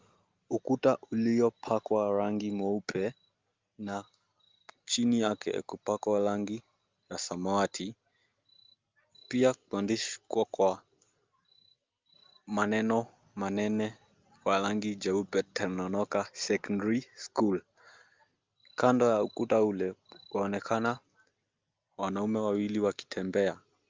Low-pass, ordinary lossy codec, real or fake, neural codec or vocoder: 7.2 kHz; Opus, 32 kbps; real; none